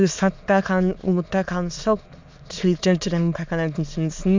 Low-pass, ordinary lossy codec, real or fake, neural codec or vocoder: 7.2 kHz; MP3, 64 kbps; fake; autoencoder, 22.05 kHz, a latent of 192 numbers a frame, VITS, trained on many speakers